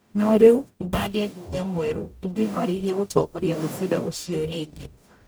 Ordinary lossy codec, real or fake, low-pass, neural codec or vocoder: none; fake; none; codec, 44.1 kHz, 0.9 kbps, DAC